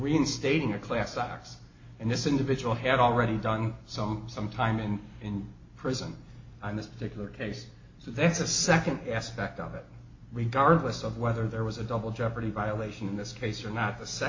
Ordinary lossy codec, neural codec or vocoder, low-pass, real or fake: MP3, 32 kbps; none; 7.2 kHz; real